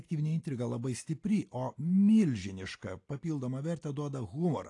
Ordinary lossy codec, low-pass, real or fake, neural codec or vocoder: AAC, 48 kbps; 10.8 kHz; real; none